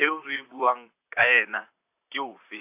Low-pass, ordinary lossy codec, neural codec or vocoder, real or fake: 3.6 kHz; AAC, 32 kbps; vocoder, 44.1 kHz, 128 mel bands, Pupu-Vocoder; fake